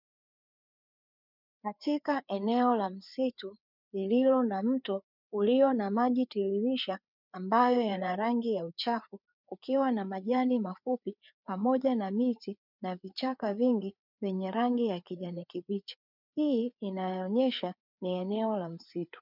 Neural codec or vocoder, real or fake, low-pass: codec, 16 kHz, 4 kbps, FreqCodec, larger model; fake; 5.4 kHz